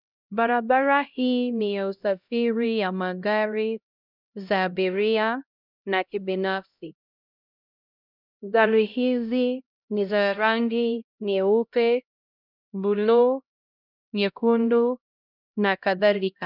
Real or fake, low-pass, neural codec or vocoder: fake; 5.4 kHz; codec, 16 kHz, 0.5 kbps, X-Codec, HuBERT features, trained on LibriSpeech